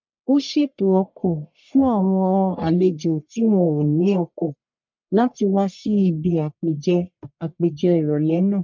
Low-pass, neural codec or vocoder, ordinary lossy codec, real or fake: 7.2 kHz; codec, 44.1 kHz, 1.7 kbps, Pupu-Codec; MP3, 64 kbps; fake